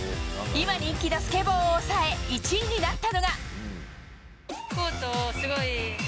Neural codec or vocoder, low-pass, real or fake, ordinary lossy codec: none; none; real; none